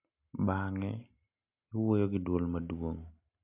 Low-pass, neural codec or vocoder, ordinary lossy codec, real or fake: 3.6 kHz; none; MP3, 32 kbps; real